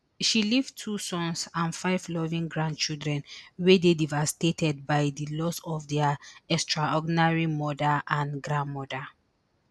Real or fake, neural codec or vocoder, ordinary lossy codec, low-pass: real; none; none; none